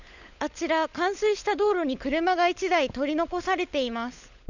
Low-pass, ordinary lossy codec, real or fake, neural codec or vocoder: 7.2 kHz; none; fake; codec, 16 kHz, 16 kbps, FunCodec, trained on LibriTTS, 50 frames a second